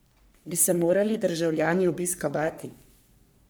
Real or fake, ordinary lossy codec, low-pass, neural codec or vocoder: fake; none; none; codec, 44.1 kHz, 3.4 kbps, Pupu-Codec